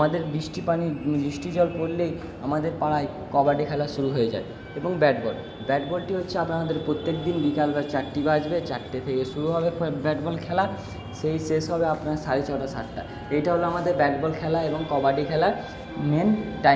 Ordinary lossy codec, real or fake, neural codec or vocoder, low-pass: none; real; none; none